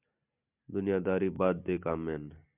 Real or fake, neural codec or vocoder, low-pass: real; none; 3.6 kHz